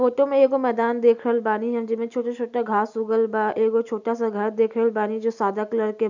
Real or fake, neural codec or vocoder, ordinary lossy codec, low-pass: real; none; none; 7.2 kHz